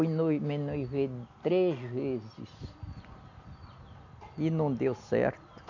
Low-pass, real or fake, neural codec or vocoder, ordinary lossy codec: 7.2 kHz; real; none; none